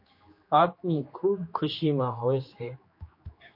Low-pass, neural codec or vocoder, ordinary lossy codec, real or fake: 5.4 kHz; codec, 16 kHz, 2 kbps, X-Codec, HuBERT features, trained on general audio; MP3, 32 kbps; fake